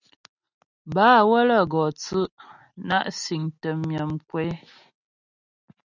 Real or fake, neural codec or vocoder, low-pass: real; none; 7.2 kHz